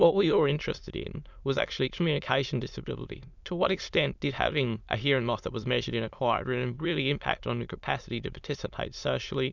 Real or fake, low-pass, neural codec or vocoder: fake; 7.2 kHz; autoencoder, 22.05 kHz, a latent of 192 numbers a frame, VITS, trained on many speakers